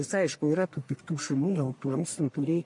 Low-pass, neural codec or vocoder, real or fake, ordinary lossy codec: 10.8 kHz; codec, 44.1 kHz, 1.7 kbps, Pupu-Codec; fake; MP3, 48 kbps